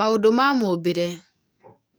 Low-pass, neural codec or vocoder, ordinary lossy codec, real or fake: none; codec, 44.1 kHz, 7.8 kbps, DAC; none; fake